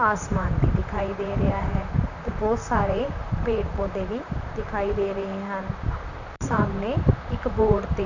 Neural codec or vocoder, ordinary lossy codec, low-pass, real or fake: vocoder, 44.1 kHz, 128 mel bands, Pupu-Vocoder; none; 7.2 kHz; fake